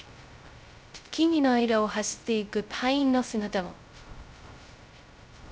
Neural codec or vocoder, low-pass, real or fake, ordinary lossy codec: codec, 16 kHz, 0.2 kbps, FocalCodec; none; fake; none